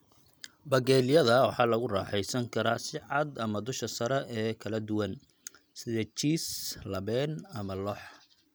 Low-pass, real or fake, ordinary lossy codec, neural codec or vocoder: none; real; none; none